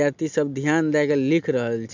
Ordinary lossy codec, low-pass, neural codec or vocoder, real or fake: MP3, 64 kbps; 7.2 kHz; none; real